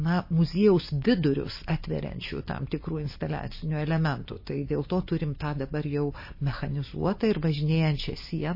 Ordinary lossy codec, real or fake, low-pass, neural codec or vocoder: MP3, 24 kbps; real; 5.4 kHz; none